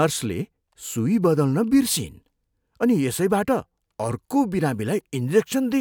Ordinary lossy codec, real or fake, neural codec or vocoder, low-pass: none; real; none; none